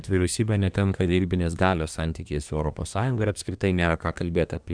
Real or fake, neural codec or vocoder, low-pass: fake; codec, 24 kHz, 1 kbps, SNAC; 9.9 kHz